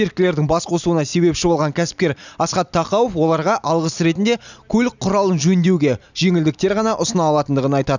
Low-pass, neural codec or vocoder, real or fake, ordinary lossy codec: 7.2 kHz; none; real; none